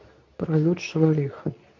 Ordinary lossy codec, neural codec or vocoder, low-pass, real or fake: MP3, 48 kbps; codec, 24 kHz, 0.9 kbps, WavTokenizer, medium speech release version 2; 7.2 kHz; fake